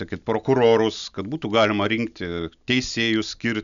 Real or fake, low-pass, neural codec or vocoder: real; 7.2 kHz; none